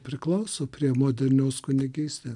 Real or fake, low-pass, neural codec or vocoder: real; 10.8 kHz; none